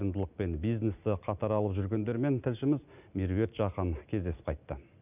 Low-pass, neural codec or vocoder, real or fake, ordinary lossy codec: 3.6 kHz; none; real; none